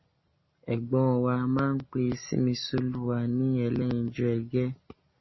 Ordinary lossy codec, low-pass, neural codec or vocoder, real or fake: MP3, 24 kbps; 7.2 kHz; none; real